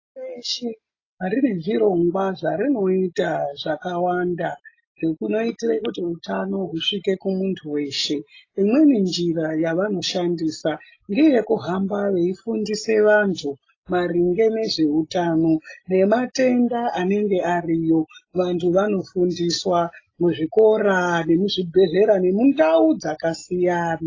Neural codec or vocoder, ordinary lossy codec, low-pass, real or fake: none; AAC, 32 kbps; 7.2 kHz; real